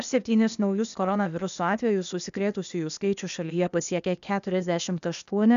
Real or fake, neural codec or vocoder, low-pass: fake; codec, 16 kHz, 0.8 kbps, ZipCodec; 7.2 kHz